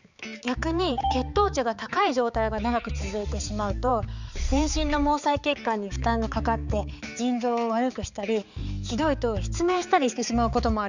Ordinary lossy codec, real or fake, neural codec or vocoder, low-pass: none; fake; codec, 16 kHz, 4 kbps, X-Codec, HuBERT features, trained on balanced general audio; 7.2 kHz